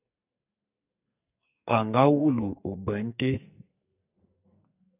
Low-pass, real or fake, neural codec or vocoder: 3.6 kHz; fake; codec, 44.1 kHz, 2.6 kbps, SNAC